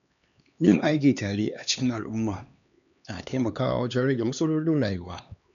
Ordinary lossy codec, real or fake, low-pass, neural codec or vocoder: none; fake; 7.2 kHz; codec, 16 kHz, 2 kbps, X-Codec, HuBERT features, trained on LibriSpeech